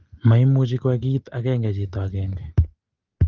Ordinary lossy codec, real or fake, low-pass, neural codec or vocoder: Opus, 16 kbps; real; 7.2 kHz; none